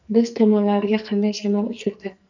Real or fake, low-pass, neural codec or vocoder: fake; 7.2 kHz; codec, 44.1 kHz, 2.6 kbps, SNAC